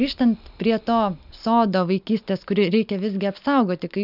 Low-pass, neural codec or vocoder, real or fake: 5.4 kHz; none; real